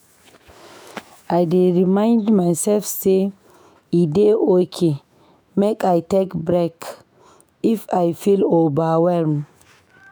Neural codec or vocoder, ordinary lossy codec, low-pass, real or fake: autoencoder, 48 kHz, 128 numbers a frame, DAC-VAE, trained on Japanese speech; none; none; fake